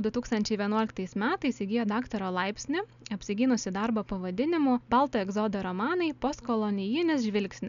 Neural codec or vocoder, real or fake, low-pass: none; real; 7.2 kHz